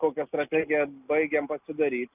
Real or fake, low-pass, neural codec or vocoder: real; 3.6 kHz; none